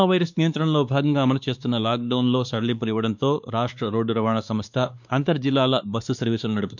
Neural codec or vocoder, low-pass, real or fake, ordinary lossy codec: codec, 16 kHz, 2 kbps, X-Codec, WavLM features, trained on Multilingual LibriSpeech; 7.2 kHz; fake; none